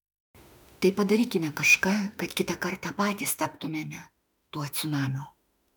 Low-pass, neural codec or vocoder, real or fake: 19.8 kHz; autoencoder, 48 kHz, 32 numbers a frame, DAC-VAE, trained on Japanese speech; fake